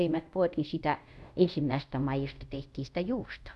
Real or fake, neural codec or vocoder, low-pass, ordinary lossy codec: fake; codec, 24 kHz, 0.5 kbps, DualCodec; none; none